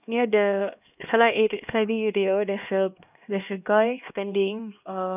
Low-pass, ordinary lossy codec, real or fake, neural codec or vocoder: 3.6 kHz; none; fake; codec, 16 kHz, 1 kbps, X-Codec, HuBERT features, trained on LibriSpeech